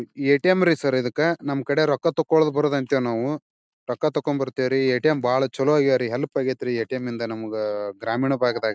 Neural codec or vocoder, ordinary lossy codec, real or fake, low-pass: none; none; real; none